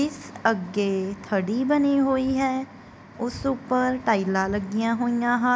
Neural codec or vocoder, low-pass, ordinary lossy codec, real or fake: none; none; none; real